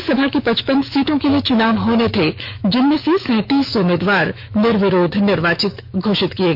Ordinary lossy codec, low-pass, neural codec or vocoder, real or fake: none; 5.4 kHz; autoencoder, 48 kHz, 128 numbers a frame, DAC-VAE, trained on Japanese speech; fake